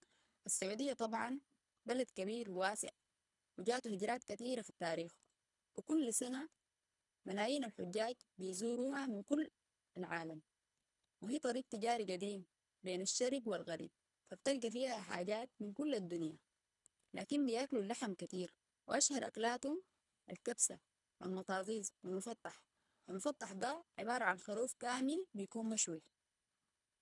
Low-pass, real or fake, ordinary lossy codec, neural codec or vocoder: 10.8 kHz; fake; none; codec, 24 kHz, 3 kbps, HILCodec